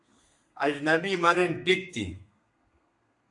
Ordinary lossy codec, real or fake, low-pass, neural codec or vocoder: AAC, 64 kbps; fake; 10.8 kHz; codec, 32 kHz, 1.9 kbps, SNAC